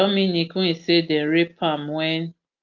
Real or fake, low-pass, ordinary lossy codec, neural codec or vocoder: real; 7.2 kHz; Opus, 24 kbps; none